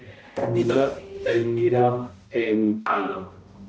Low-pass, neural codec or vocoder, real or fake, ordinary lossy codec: none; codec, 16 kHz, 0.5 kbps, X-Codec, HuBERT features, trained on balanced general audio; fake; none